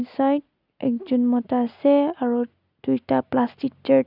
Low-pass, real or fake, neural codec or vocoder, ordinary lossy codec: 5.4 kHz; real; none; none